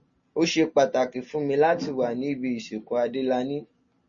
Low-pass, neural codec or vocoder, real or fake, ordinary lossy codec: 7.2 kHz; none; real; MP3, 32 kbps